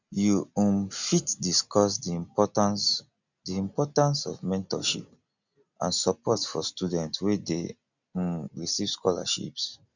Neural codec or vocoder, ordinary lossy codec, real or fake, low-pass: none; none; real; 7.2 kHz